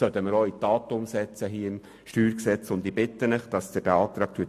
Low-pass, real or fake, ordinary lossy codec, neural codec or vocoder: 14.4 kHz; real; MP3, 64 kbps; none